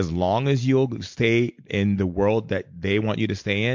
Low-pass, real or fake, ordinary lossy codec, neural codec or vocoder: 7.2 kHz; real; MP3, 48 kbps; none